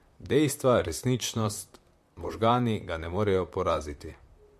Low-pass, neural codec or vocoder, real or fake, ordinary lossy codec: 14.4 kHz; vocoder, 44.1 kHz, 128 mel bands, Pupu-Vocoder; fake; MP3, 64 kbps